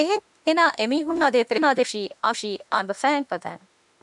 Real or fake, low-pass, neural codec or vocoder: fake; 10.8 kHz; autoencoder, 48 kHz, 32 numbers a frame, DAC-VAE, trained on Japanese speech